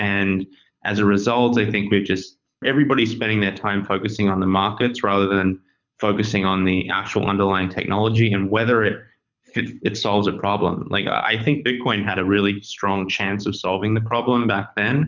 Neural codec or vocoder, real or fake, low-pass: codec, 24 kHz, 6 kbps, HILCodec; fake; 7.2 kHz